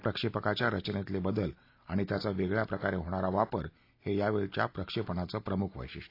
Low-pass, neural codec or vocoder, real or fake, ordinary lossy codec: 5.4 kHz; none; real; AAC, 32 kbps